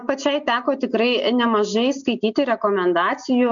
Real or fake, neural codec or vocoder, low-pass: real; none; 7.2 kHz